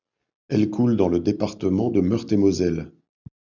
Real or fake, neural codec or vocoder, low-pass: real; none; 7.2 kHz